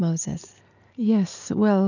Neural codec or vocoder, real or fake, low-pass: none; real; 7.2 kHz